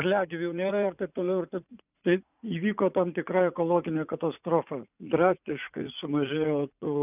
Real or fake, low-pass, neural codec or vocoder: fake; 3.6 kHz; vocoder, 44.1 kHz, 80 mel bands, Vocos